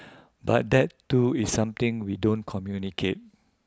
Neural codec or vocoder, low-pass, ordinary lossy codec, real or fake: none; none; none; real